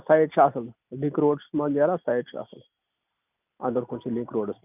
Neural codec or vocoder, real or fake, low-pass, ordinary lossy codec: none; real; 3.6 kHz; none